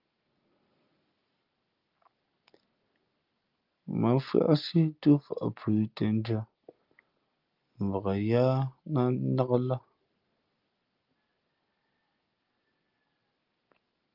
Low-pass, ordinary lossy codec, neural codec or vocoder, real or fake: 5.4 kHz; Opus, 32 kbps; none; real